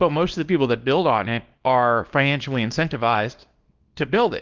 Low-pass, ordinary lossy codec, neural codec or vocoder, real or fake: 7.2 kHz; Opus, 32 kbps; codec, 24 kHz, 0.9 kbps, WavTokenizer, small release; fake